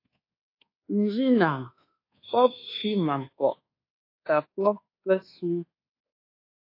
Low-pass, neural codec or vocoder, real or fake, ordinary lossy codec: 5.4 kHz; codec, 24 kHz, 1.2 kbps, DualCodec; fake; AAC, 32 kbps